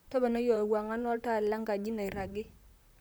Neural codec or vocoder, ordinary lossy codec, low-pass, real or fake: vocoder, 44.1 kHz, 128 mel bands, Pupu-Vocoder; none; none; fake